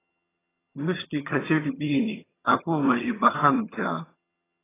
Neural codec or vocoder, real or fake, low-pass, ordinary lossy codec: vocoder, 22.05 kHz, 80 mel bands, HiFi-GAN; fake; 3.6 kHz; AAC, 16 kbps